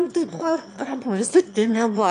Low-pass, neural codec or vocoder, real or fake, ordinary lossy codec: 9.9 kHz; autoencoder, 22.05 kHz, a latent of 192 numbers a frame, VITS, trained on one speaker; fake; MP3, 96 kbps